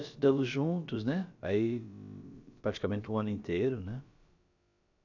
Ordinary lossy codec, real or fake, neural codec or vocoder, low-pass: none; fake; codec, 16 kHz, about 1 kbps, DyCAST, with the encoder's durations; 7.2 kHz